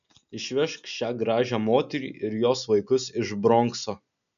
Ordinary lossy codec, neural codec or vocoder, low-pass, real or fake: MP3, 96 kbps; none; 7.2 kHz; real